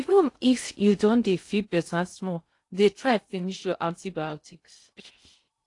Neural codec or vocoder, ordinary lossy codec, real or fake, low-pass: codec, 16 kHz in and 24 kHz out, 0.6 kbps, FocalCodec, streaming, 2048 codes; AAC, 48 kbps; fake; 10.8 kHz